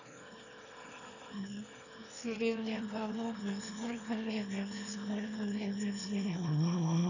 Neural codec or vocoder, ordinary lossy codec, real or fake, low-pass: autoencoder, 22.05 kHz, a latent of 192 numbers a frame, VITS, trained on one speaker; none; fake; 7.2 kHz